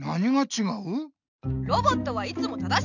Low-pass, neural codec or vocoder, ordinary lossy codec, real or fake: 7.2 kHz; none; none; real